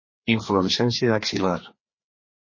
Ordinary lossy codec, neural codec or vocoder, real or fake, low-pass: MP3, 32 kbps; codec, 16 kHz, 2 kbps, FreqCodec, larger model; fake; 7.2 kHz